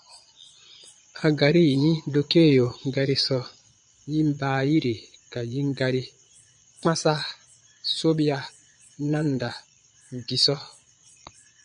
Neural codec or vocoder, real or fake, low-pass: vocoder, 22.05 kHz, 80 mel bands, Vocos; fake; 9.9 kHz